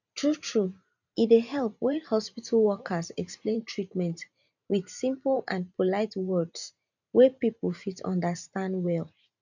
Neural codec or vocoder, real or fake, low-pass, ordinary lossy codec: vocoder, 44.1 kHz, 128 mel bands every 512 samples, BigVGAN v2; fake; 7.2 kHz; none